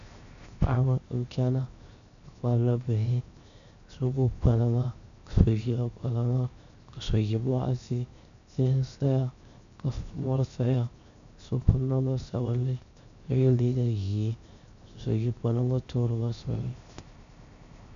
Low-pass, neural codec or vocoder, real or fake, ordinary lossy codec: 7.2 kHz; codec, 16 kHz, 0.7 kbps, FocalCodec; fake; AAC, 96 kbps